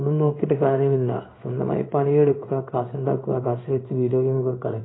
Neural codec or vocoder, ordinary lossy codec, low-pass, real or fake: codec, 16 kHz in and 24 kHz out, 1 kbps, XY-Tokenizer; AAC, 16 kbps; 7.2 kHz; fake